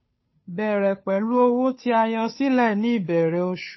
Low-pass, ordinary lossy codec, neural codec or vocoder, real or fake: 7.2 kHz; MP3, 24 kbps; codec, 16 kHz, 2 kbps, FunCodec, trained on Chinese and English, 25 frames a second; fake